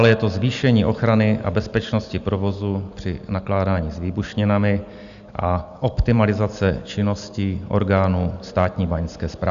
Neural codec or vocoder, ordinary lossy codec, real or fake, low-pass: none; Opus, 64 kbps; real; 7.2 kHz